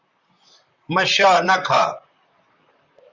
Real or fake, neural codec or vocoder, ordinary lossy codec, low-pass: fake; vocoder, 44.1 kHz, 128 mel bands, Pupu-Vocoder; Opus, 64 kbps; 7.2 kHz